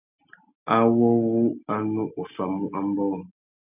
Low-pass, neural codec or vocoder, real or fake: 3.6 kHz; none; real